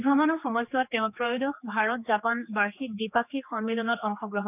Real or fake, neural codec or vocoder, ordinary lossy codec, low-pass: fake; codec, 16 kHz, 2 kbps, X-Codec, HuBERT features, trained on general audio; none; 3.6 kHz